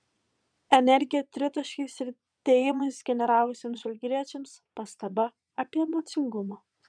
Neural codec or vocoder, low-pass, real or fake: vocoder, 22.05 kHz, 80 mel bands, Vocos; 9.9 kHz; fake